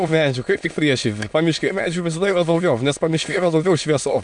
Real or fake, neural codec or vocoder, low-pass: fake; autoencoder, 22.05 kHz, a latent of 192 numbers a frame, VITS, trained on many speakers; 9.9 kHz